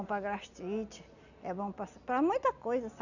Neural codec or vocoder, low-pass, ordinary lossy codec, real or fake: none; 7.2 kHz; none; real